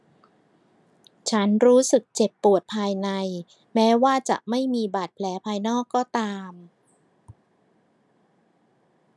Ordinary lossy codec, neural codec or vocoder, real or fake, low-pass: none; none; real; none